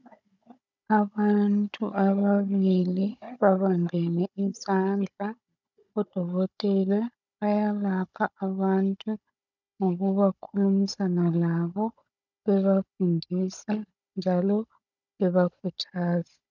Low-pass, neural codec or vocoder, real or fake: 7.2 kHz; codec, 16 kHz, 16 kbps, FunCodec, trained on Chinese and English, 50 frames a second; fake